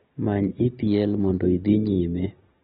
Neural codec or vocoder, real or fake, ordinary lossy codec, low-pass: none; real; AAC, 16 kbps; 19.8 kHz